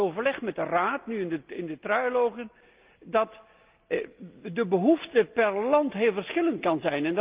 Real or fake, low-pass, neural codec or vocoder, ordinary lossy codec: real; 3.6 kHz; none; Opus, 24 kbps